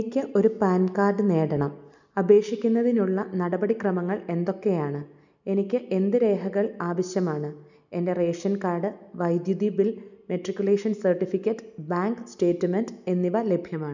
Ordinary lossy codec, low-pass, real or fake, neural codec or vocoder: none; 7.2 kHz; real; none